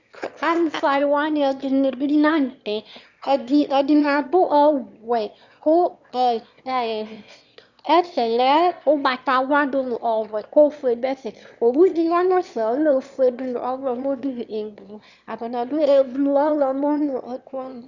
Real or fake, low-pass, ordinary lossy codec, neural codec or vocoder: fake; 7.2 kHz; Opus, 64 kbps; autoencoder, 22.05 kHz, a latent of 192 numbers a frame, VITS, trained on one speaker